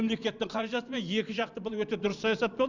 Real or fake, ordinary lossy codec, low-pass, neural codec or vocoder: fake; none; 7.2 kHz; vocoder, 44.1 kHz, 128 mel bands every 512 samples, BigVGAN v2